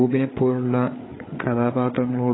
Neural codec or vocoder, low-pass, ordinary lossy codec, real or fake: codec, 16 kHz, 8 kbps, FreqCodec, larger model; 7.2 kHz; AAC, 16 kbps; fake